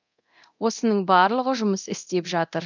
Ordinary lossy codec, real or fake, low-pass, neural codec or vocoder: none; fake; 7.2 kHz; codec, 24 kHz, 0.9 kbps, DualCodec